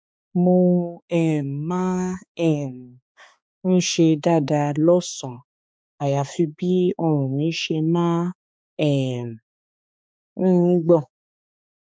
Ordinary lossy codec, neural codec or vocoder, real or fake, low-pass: none; codec, 16 kHz, 4 kbps, X-Codec, HuBERT features, trained on balanced general audio; fake; none